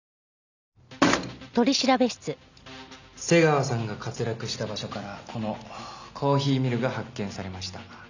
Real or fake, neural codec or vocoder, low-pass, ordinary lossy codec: real; none; 7.2 kHz; none